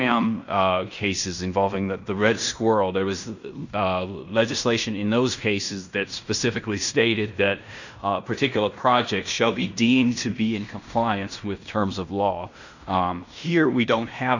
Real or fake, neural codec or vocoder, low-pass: fake; codec, 16 kHz in and 24 kHz out, 0.9 kbps, LongCat-Audio-Codec, fine tuned four codebook decoder; 7.2 kHz